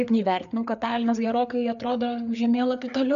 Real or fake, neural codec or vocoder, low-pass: fake; codec, 16 kHz, 4 kbps, FreqCodec, larger model; 7.2 kHz